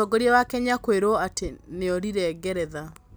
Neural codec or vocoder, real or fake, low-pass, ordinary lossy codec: none; real; none; none